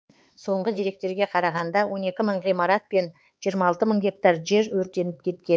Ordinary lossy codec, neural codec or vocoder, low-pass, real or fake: none; codec, 16 kHz, 4 kbps, X-Codec, HuBERT features, trained on LibriSpeech; none; fake